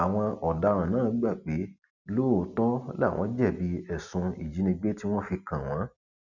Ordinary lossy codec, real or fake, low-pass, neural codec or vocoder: none; real; 7.2 kHz; none